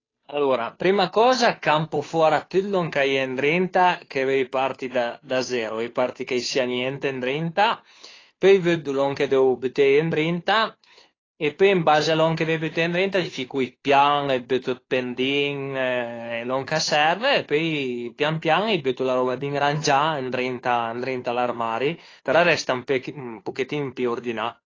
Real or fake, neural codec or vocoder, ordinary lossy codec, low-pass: fake; codec, 16 kHz, 2 kbps, FunCodec, trained on Chinese and English, 25 frames a second; AAC, 32 kbps; 7.2 kHz